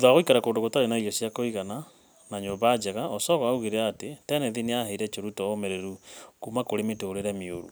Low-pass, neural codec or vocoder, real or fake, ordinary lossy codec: none; none; real; none